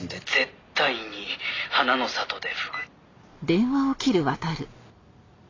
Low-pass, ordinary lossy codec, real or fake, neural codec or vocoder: 7.2 kHz; AAC, 32 kbps; real; none